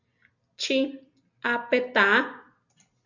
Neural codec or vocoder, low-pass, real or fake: none; 7.2 kHz; real